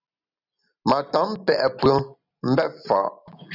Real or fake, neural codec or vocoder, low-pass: real; none; 5.4 kHz